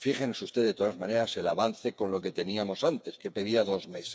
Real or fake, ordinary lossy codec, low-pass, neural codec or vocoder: fake; none; none; codec, 16 kHz, 4 kbps, FreqCodec, smaller model